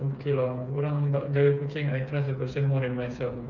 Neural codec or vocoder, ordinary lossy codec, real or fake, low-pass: codec, 16 kHz, 4 kbps, FreqCodec, smaller model; none; fake; 7.2 kHz